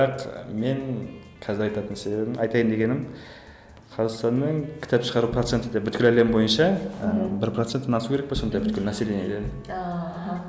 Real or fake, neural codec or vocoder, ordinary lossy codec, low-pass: real; none; none; none